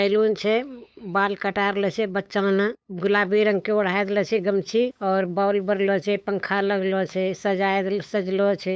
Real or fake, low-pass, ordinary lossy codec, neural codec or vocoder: fake; none; none; codec, 16 kHz, 8 kbps, FunCodec, trained on LibriTTS, 25 frames a second